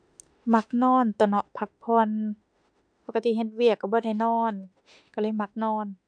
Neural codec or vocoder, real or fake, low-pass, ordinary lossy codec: autoencoder, 48 kHz, 32 numbers a frame, DAC-VAE, trained on Japanese speech; fake; 9.9 kHz; none